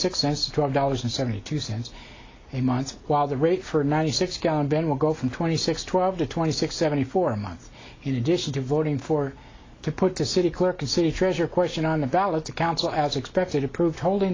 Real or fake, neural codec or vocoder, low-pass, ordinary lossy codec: real; none; 7.2 kHz; AAC, 32 kbps